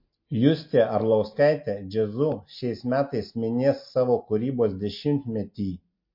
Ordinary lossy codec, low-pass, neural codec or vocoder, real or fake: MP3, 32 kbps; 5.4 kHz; none; real